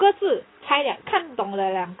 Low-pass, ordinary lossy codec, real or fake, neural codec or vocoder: 7.2 kHz; AAC, 16 kbps; real; none